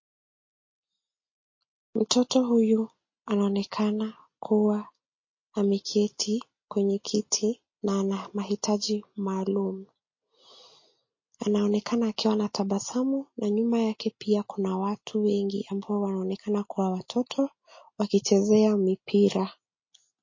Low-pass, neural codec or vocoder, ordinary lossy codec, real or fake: 7.2 kHz; none; MP3, 32 kbps; real